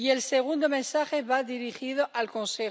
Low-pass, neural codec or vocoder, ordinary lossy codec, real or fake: none; none; none; real